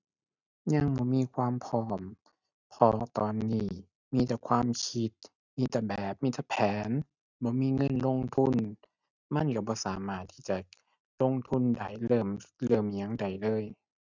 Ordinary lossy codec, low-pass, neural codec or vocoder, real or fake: none; 7.2 kHz; none; real